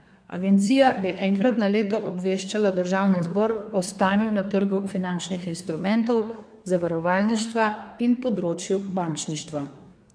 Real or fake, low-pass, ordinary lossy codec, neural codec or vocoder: fake; 9.9 kHz; none; codec, 24 kHz, 1 kbps, SNAC